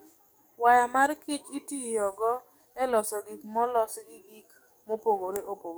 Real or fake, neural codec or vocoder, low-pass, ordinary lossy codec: fake; codec, 44.1 kHz, 7.8 kbps, DAC; none; none